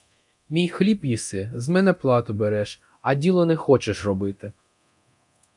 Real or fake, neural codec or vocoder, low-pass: fake; codec, 24 kHz, 0.9 kbps, DualCodec; 10.8 kHz